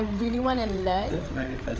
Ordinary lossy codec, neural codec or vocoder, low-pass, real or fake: none; codec, 16 kHz, 8 kbps, FreqCodec, larger model; none; fake